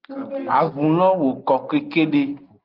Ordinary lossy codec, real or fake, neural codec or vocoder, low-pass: Opus, 32 kbps; real; none; 5.4 kHz